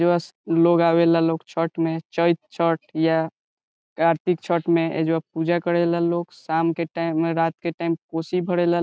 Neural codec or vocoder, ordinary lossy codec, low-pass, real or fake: none; none; none; real